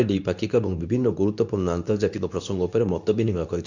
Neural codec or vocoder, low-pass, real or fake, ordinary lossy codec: codec, 24 kHz, 0.9 kbps, WavTokenizer, medium speech release version 2; 7.2 kHz; fake; none